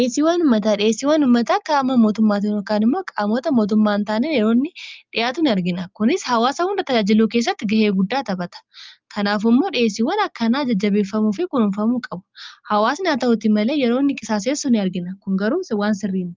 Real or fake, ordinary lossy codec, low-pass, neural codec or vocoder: real; Opus, 24 kbps; 7.2 kHz; none